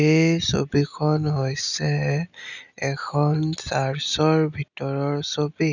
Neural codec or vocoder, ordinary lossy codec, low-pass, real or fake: none; none; 7.2 kHz; real